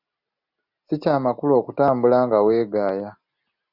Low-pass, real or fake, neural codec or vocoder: 5.4 kHz; real; none